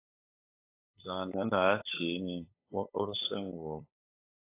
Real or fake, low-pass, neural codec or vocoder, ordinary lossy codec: fake; 3.6 kHz; codec, 16 kHz, 8 kbps, FunCodec, trained on LibriTTS, 25 frames a second; AAC, 24 kbps